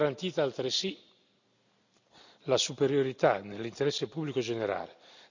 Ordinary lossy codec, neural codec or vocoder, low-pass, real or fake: none; none; 7.2 kHz; real